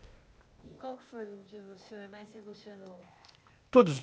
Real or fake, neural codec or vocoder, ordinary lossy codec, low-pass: fake; codec, 16 kHz, 0.8 kbps, ZipCodec; none; none